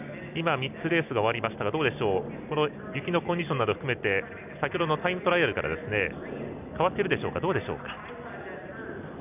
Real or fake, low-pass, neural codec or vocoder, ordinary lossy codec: real; 3.6 kHz; none; none